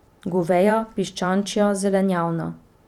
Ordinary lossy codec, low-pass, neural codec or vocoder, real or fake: none; 19.8 kHz; vocoder, 44.1 kHz, 128 mel bands every 512 samples, BigVGAN v2; fake